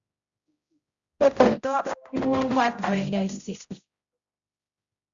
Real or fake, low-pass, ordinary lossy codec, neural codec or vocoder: fake; 7.2 kHz; Opus, 64 kbps; codec, 16 kHz, 0.5 kbps, X-Codec, HuBERT features, trained on general audio